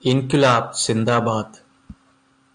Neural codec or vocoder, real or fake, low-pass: none; real; 9.9 kHz